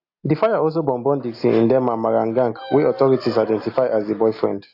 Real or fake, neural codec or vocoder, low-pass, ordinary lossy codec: real; none; 5.4 kHz; AAC, 32 kbps